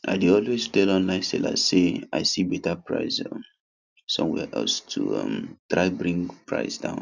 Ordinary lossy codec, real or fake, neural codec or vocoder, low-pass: none; real; none; 7.2 kHz